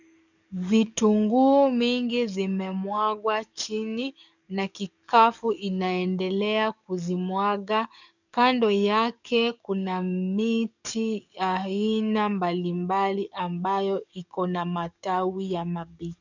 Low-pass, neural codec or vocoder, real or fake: 7.2 kHz; codec, 44.1 kHz, 7.8 kbps, DAC; fake